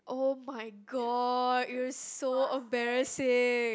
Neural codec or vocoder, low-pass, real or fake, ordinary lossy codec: none; none; real; none